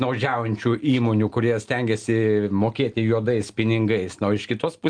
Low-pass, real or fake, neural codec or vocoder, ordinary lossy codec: 9.9 kHz; real; none; Opus, 32 kbps